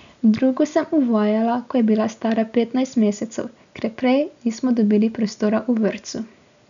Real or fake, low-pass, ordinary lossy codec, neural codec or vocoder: real; 7.2 kHz; none; none